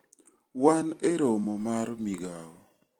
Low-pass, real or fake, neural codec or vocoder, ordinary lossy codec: 19.8 kHz; fake; vocoder, 44.1 kHz, 128 mel bands every 256 samples, BigVGAN v2; Opus, 24 kbps